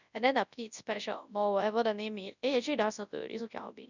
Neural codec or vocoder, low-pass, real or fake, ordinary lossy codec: codec, 24 kHz, 0.9 kbps, WavTokenizer, large speech release; 7.2 kHz; fake; none